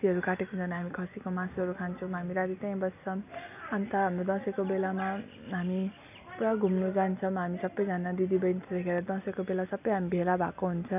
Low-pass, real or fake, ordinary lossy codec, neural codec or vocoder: 3.6 kHz; real; none; none